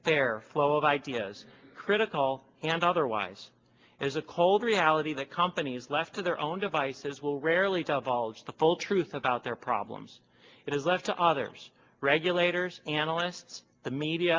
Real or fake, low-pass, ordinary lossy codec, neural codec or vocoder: real; 7.2 kHz; Opus, 32 kbps; none